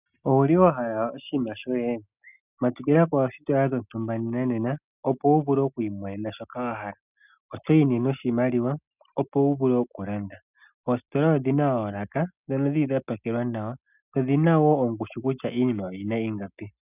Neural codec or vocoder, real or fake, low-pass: none; real; 3.6 kHz